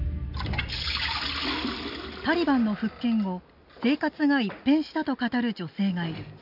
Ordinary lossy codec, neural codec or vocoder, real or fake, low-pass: none; none; real; 5.4 kHz